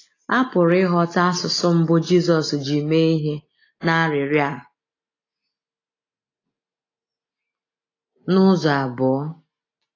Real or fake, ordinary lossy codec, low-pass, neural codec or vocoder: real; AAC, 32 kbps; 7.2 kHz; none